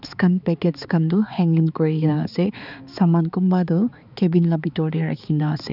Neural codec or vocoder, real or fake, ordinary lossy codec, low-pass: codec, 16 kHz, 4 kbps, X-Codec, HuBERT features, trained on balanced general audio; fake; none; 5.4 kHz